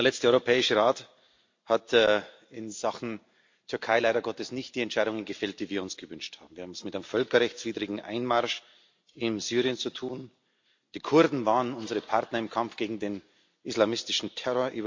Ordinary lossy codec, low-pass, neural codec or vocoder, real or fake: MP3, 48 kbps; 7.2 kHz; none; real